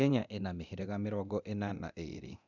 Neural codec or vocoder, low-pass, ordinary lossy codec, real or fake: codec, 24 kHz, 0.9 kbps, DualCodec; 7.2 kHz; none; fake